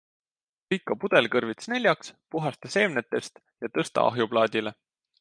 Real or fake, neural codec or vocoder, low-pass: real; none; 9.9 kHz